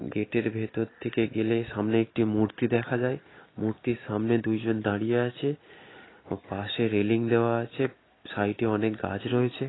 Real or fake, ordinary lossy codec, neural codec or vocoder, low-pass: real; AAC, 16 kbps; none; 7.2 kHz